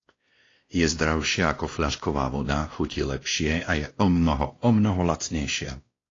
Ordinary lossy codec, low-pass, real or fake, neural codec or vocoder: AAC, 32 kbps; 7.2 kHz; fake; codec, 16 kHz, 1 kbps, X-Codec, WavLM features, trained on Multilingual LibriSpeech